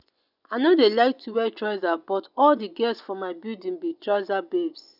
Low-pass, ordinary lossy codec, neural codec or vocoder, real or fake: 5.4 kHz; none; vocoder, 24 kHz, 100 mel bands, Vocos; fake